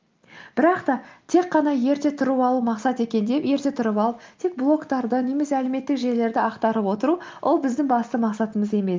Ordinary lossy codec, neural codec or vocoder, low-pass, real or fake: Opus, 32 kbps; none; 7.2 kHz; real